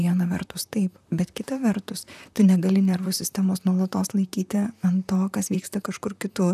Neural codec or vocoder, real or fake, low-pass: vocoder, 44.1 kHz, 128 mel bands, Pupu-Vocoder; fake; 14.4 kHz